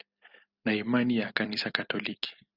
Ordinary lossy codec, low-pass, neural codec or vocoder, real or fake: Opus, 64 kbps; 5.4 kHz; none; real